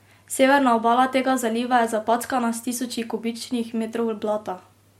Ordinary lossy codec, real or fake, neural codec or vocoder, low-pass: MP3, 64 kbps; real; none; 19.8 kHz